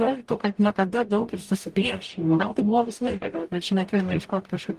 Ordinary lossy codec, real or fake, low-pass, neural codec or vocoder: Opus, 24 kbps; fake; 14.4 kHz; codec, 44.1 kHz, 0.9 kbps, DAC